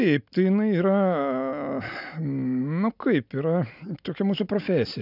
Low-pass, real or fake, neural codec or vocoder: 5.4 kHz; real; none